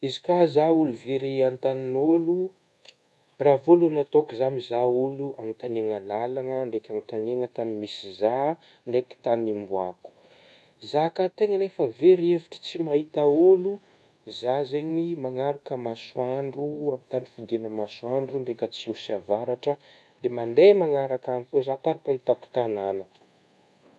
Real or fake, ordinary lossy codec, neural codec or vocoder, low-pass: fake; none; codec, 24 kHz, 1.2 kbps, DualCodec; none